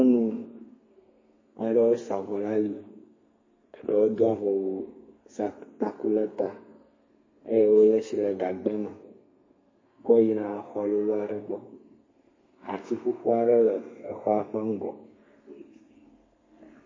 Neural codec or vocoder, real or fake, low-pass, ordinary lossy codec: codec, 32 kHz, 1.9 kbps, SNAC; fake; 7.2 kHz; MP3, 32 kbps